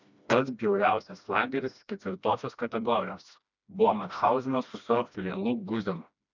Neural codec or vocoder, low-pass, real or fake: codec, 16 kHz, 1 kbps, FreqCodec, smaller model; 7.2 kHz; fake